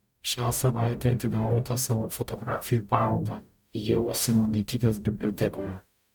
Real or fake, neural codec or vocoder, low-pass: fake; codec, 44.1 kHz, 0.9 kbps, DAC; 19.8 kHz